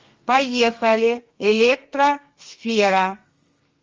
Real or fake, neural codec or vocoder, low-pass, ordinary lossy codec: fake; codec, 32 kHz, 1.9 kbps, SNAC; 7.2 kHz; Opus, 32 kbps